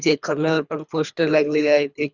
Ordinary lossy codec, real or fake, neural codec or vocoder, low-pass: Opus, 64 kbps; fake; codec, 24 kHz, 3 kbps, HILCodec; 7.2 kHz